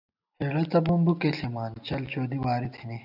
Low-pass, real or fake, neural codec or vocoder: 5.4 kHz; real; none